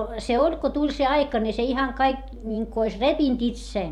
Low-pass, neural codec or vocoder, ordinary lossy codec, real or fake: 19.8 kHz; vocoder, 44.1 kHz, 128 mel bands every 512 samples, BigVGAN v2; none; fake